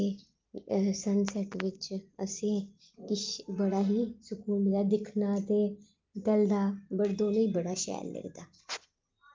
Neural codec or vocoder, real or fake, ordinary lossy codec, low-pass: none; real; Opus, 24 kbps; 7.2 kHz